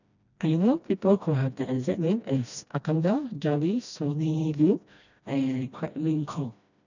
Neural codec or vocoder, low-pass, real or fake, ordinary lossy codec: codec, 16 kHz, 1 kbps, FreqCodec, smaller model; 7.2 kHz; fake; none